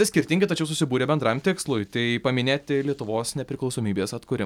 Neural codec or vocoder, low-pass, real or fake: none; 19.8 kHz; real